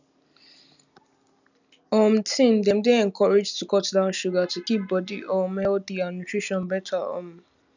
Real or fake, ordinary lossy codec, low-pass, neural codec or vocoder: real; none; 7.2 kHz; none